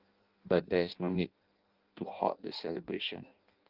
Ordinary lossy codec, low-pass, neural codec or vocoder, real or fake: Opus, 32 kbps; 5.4 kHz; codec, 16 kHz in and 24 kHz out, 0.6 kbps, FireRedTTS-2 codec; fake